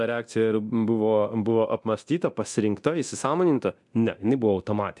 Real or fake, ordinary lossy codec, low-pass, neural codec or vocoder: fake; MP3, 96 kbps; 10.8 kHz; codec, 24 kHz, 0.9 kbps, DualCodec